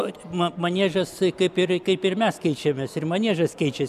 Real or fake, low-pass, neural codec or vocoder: real; 14.4 kHz; none